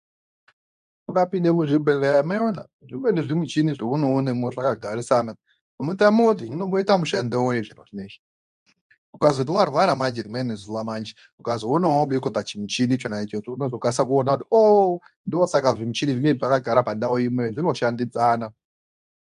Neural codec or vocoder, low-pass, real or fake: codec, 24 kHz, 0.9 kbps, WavTokenizer, medium speech release version 2; 10.8 kHz; fake